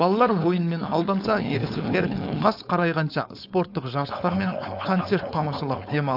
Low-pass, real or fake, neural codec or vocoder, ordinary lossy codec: 5.4 kHz; fake; codec, 16 kHz, 4.8 kbps, FACodec; AAC, 48 kbps